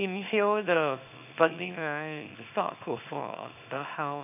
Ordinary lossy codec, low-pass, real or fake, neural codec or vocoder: none; 3.6 kHz; fake; codec, 24 kHz, 0.9 kbps, WavTokenizer, small release